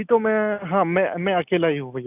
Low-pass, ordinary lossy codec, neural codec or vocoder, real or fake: 3.6 kHz; none; none; real